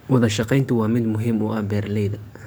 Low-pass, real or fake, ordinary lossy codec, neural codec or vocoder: none; fake; none; codec, 44.1 kHz, 7.8 kbps, DAC